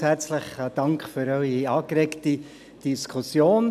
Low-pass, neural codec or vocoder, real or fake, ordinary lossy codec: 14.4 kHz; none; real; none